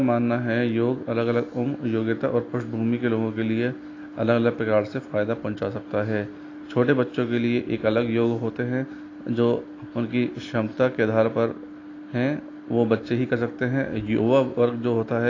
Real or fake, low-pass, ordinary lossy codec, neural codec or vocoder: real; 7.2 kHz; AAC, 32 kbps; none